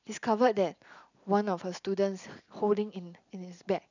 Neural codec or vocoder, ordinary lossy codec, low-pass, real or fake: vocoder, 44.1 kHz, 128 mel bands every 256 samples, BigVGAN v2; none; 7.2 kHz; fake